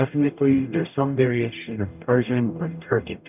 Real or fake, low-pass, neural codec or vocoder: fake; 3.6 kHz; codec, 44.1 kHz, 0.9 kbps, DAC